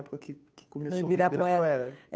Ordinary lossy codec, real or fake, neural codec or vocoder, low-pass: none; fake; codec, 16 kHz, 2 kbps, FunCodec, trained on Chinese and English, 25 frames a second; none